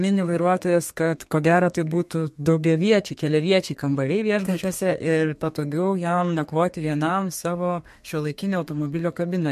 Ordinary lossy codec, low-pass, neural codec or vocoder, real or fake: MP3, 64 kbps; 14.4 kHz; codec, 32 kHz, 1.9 kbps, SNAC; fake